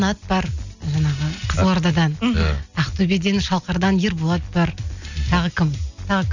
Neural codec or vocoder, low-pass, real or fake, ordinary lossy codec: none; 7.2 kHz; real; none